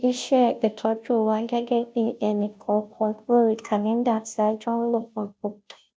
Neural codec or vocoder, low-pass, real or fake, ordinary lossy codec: codec, 16 kHz, 0.5 kbps, FunCodec, trained on Chinese and English, 25 frames a second; none; fake; none